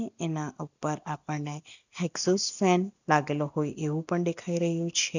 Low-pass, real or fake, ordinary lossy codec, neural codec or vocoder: 7.2 kHz; real; none; none